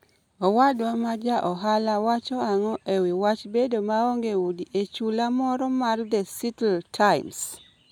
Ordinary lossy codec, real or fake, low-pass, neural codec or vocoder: none; real; 19.8 kHz; none